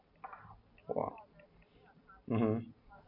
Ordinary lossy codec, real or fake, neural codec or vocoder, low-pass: MP3, 48 kbps; real; none; 5.4 kHz